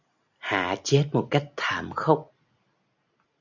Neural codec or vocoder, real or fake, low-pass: none; real; 7.2 kHz